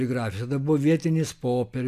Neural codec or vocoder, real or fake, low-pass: none; real; 14.4 kHz